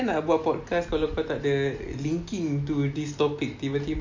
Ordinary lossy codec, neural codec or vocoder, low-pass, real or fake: MP3, 48 kbps; none; 7.2 kHz; real